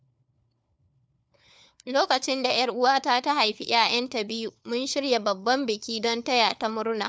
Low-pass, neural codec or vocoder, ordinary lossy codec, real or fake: none; codec, 16 kHz, 4 kbps, FunCodec, trained on LibriTTS, 50 frames a second; none; fake